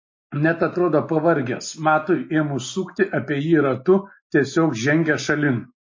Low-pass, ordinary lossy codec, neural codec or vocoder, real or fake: 7.2 kHz; MP3, 32 kbps; none; real